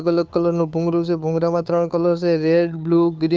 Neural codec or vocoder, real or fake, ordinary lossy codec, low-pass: codec, 16 kHz, 2 kbps, FunCodec, trained on Chinese and English, 25 frames a second; fake; none; none